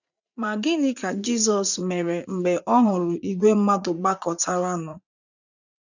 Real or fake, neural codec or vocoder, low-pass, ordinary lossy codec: fake; vocoder, 44.1 kHz, 80 mel bands, Vocos; 7.2 kHz; none